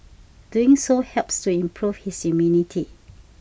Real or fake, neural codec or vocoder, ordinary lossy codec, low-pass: real; none; none; none